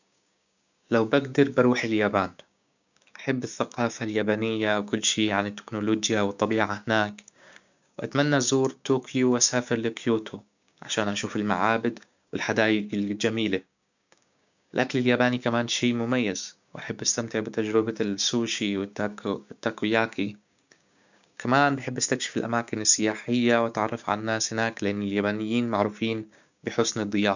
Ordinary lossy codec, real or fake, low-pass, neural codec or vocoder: none; fake; 7.2 kHz; codec, 16 kHz, 6 kbps, DAC